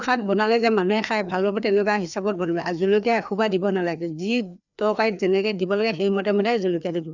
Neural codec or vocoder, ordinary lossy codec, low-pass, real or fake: codec, 16 kHz, 2 kbps, FreqCodec, larger model; none; 7.2 kHz; fake